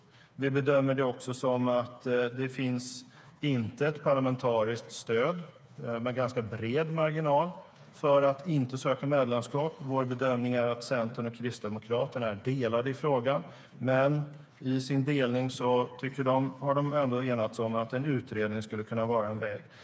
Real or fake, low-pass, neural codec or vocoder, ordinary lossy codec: fake; none; codec, 16 kHz, 4 kbps, FreqCodec, smaller model; none